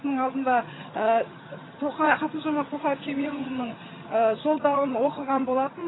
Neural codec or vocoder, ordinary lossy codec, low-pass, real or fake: vocoder, 22.05 kHz, 80 mel bands, HiFi-GAN; AAC, 16 kbps; 7.2 kHz; fake